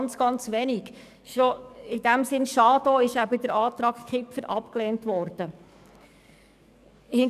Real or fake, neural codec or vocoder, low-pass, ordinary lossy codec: fake; codec, 44.1 kHz, 7.8 kbps, DAC; 14.4 kHz; none